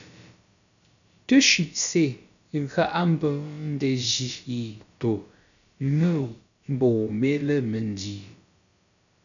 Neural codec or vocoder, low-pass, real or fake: codec, 16 kHz, about 1 kbps, DyCAST, with the encoder's durations; 7.2 kHz; fake